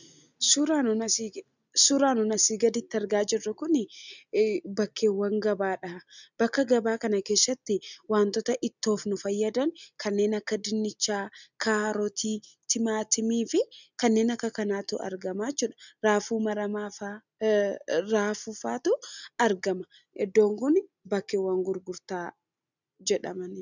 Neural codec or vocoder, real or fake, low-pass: none; real; 7.2 kHz